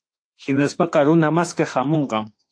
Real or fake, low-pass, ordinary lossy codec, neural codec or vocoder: fake; 9.9 kHz; AAC, 48 kbps; autoencoder, 48 kHz, 32 numbers a frame, DAC-VAE, trained on Japanese speech